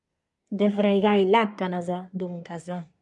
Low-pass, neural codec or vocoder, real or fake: 10.8 kHz; codec, 24 kHz, 1 kbps, SNAC; fake